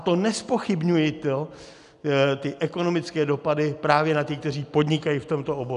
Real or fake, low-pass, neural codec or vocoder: real; 10.8 kHz; none